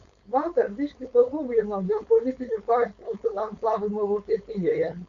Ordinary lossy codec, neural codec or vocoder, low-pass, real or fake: Opus, 64 kbps; codec, 16 kHz, 4.8 kbps, FACodec; 7.2 kHz; fake